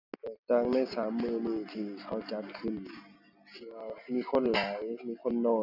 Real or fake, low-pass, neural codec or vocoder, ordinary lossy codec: real; 5.4 kHz; none; none